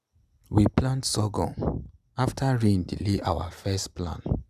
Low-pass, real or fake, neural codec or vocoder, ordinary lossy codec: 14.4 kHz; real; none; Opus, 64 kbps